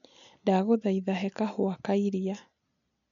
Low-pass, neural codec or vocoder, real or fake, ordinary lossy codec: 7.2 kHz; none; real; none